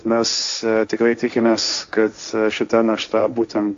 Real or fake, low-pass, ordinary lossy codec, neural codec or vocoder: fake; 7.2 kHz; AAC, 64 kbps; codec, 16 kHz, 1.1 kbps, Voila-Tokenizer